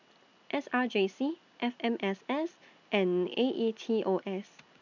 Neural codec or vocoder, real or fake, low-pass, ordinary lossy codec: none; real; 7.2 kHz; none